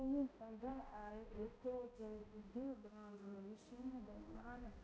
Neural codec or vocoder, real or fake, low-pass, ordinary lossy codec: codec, 16 kHz, 0.5 kbps, X-Codec, HuBERT features, trained on balanced general audio; fake; none; none